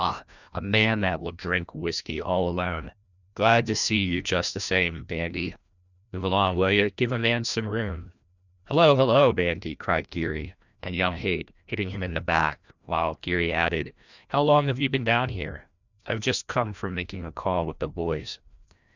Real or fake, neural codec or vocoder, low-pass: fake; codec, 16 kHz, 1 kbps, FreqCodec, larger model; 7.2 kHz